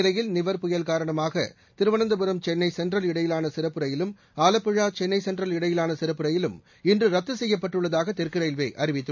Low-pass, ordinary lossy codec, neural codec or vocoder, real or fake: 7.2 kHz; MP3, 64 kbps; none; real